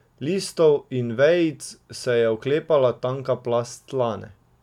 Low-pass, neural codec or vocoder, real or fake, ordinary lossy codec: 19.8 kHz; none; real; none